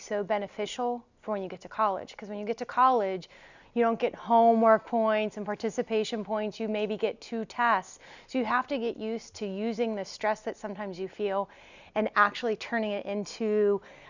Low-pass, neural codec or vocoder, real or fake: 7.2 kHz; none; real